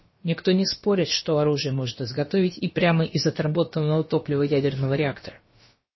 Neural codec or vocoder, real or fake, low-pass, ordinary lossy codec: codec, 16 kHz, about 1 kbps, DyCAST, with the encoder's durations; fake; 7.2 kHz; MP3, 24 kbps